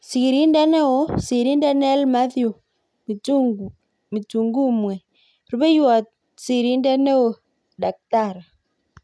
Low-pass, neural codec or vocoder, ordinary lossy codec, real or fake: none; none; none; real